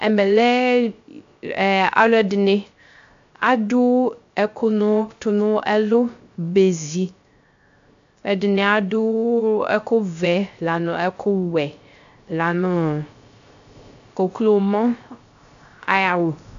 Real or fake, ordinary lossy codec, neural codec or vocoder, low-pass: fake; AAC, 64 kbps; codec, 16 kHz, 0.3 kbps, FocalCodec; 7.2 kHz